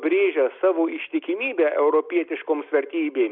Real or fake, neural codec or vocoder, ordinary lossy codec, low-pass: real; none; Opus, 64 kbps; 5.4 kHz